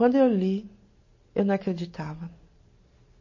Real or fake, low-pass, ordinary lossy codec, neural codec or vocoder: real; 7.2 kHz; MP3, 32 kbps; none